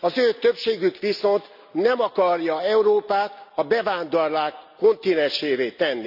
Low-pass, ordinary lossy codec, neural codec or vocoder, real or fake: 5.4 kHz; none; none; real